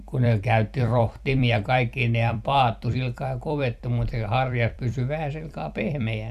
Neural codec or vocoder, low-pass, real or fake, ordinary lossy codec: vocoder, 44.1 kHz, 128 mel bands every 256 samples, BigVGAN v2; 14.4 kHz; fake; none